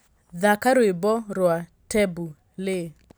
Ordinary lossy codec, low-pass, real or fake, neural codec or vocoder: none; none; real; none